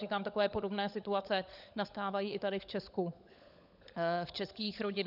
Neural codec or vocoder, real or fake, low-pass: codec, 16 kHz, 16 kbps, FunCodec, trained on LibriTTS, 50 frames a second; fake; 5.4 kHz